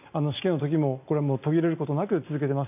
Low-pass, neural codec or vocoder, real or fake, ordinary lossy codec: 3.6 kHz; none; real; AAC, 32 kbps